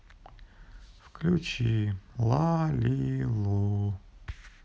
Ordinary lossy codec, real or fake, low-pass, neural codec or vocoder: none; real; none; none